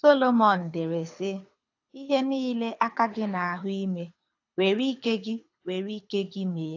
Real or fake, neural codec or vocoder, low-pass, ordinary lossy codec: fake; codec, 24 kHz, 6 kbps, HILCodec; 7.2 kHz; AAC, 48 kbps